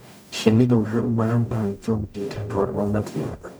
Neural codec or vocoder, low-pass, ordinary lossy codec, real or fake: codec, 44.1 kHz, 0.9 kbps, DAC; none; none; fake